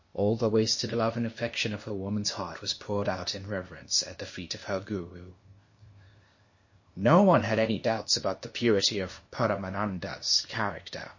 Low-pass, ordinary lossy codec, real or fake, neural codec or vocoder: 7.2 kHz; MP3, 32 kbps; fake; codec, 16 kHz, 0.8 kbps, ZipCodec